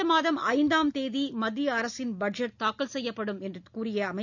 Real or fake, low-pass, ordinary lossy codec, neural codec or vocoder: real; 7.2 kHz; none; none